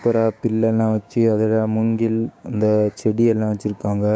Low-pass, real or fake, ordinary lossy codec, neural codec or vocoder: none; fake; none; codec, 16 kHz, 6 kbps, DAC